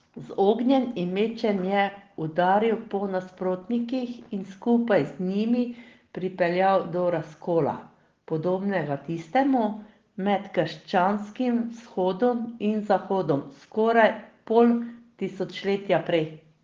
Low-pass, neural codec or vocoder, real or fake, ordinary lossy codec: 7.2 kHz; none; real; Opus, 16 kbps